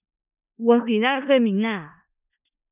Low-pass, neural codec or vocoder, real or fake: 3.6 kHz; codec, 16 kHz in and 24 kHz out, 0.4 kbps, LongCat-Audio-Codec, four codebook decoder; fake